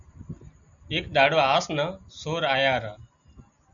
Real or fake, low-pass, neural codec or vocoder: real; 7.2 kHz; none